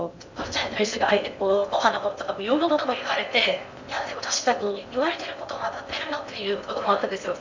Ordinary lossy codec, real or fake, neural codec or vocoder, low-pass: MP3, 64 kbps; fake; codec, 16 kHz in and 24 kHz out, 0.6 kbps, FocalCodec, streaming, 2048 codes; 7.2 kHz